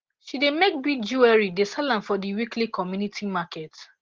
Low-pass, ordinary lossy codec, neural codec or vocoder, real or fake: 7.2 kHz; Opus, 16 kbps; none; real